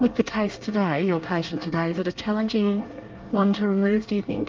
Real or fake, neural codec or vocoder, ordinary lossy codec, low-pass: fake; codec, 24 kHz, 1 kbps, SNAC; Opus, 24 kbps; 7.2 kHz